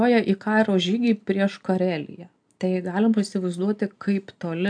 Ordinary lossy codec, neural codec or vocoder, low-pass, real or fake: AAC, 64 kbps; none; 9.9 kHz; real